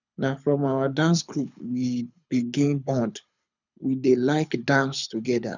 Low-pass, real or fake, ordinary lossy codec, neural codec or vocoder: 7.2 kHz; fake; none; codec, 24 kHz, 6 kbps, HILCodec